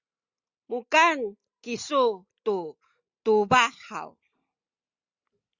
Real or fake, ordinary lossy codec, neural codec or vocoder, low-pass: real; Opus, 64 kbps; none; 7.2 kHz